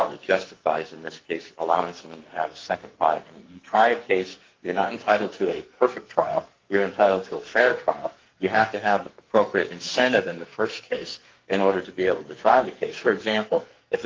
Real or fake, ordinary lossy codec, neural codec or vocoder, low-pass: fake; Opus, 32 kbps; codec, 44.1 kHz, 2.6 kbps, SNAC; 7.2 kHz